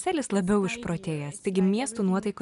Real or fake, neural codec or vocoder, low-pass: real; none; 10.8 kHz